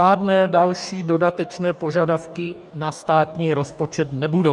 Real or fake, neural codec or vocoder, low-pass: fake; codec, 44.1 kHz, 2.6 kbps, DAC; 10.8 kHz